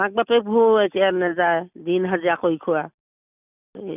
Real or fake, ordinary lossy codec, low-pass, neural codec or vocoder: real; none; 3.6 kHz; none